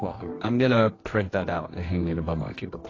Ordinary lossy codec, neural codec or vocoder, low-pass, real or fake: AAC, 32 kbps; codec, 24 kHz, 0.9 kbps, WavTokenizer, medium music audio release; 7.2 kHz; fake